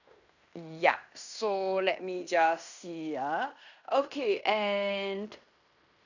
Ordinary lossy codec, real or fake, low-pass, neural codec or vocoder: none; fake; 7.2 kHz; codec, 16 kHz in and 24 kHz out, 0.9 kbps, LongCat-Audio-Codec, fine tuned four codebook decoder